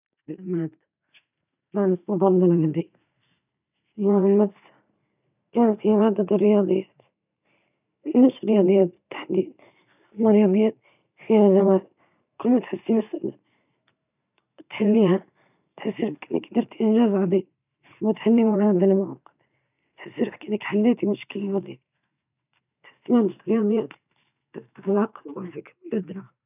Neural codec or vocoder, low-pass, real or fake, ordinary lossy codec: vocoder, 44.1 kHz, 128 mel bands every 512 samples, BigVGAN v2; 3.6 kHz; fake; none